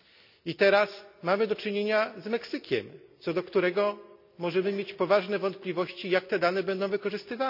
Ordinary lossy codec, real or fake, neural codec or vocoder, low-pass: none; real; none; 5.4 kHz